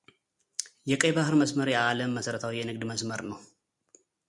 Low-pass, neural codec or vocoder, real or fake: 10.8 kHz; none; real